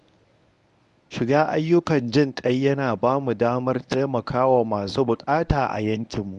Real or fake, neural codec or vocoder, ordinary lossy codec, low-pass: fake; codec, 24 kHz, 0.9 kbps, WavTokenizer, medium speech release version 1; none; 10.8 kHz